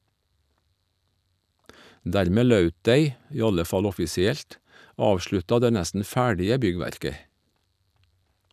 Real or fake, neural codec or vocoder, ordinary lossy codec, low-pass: real; none; none; 14.4 kHz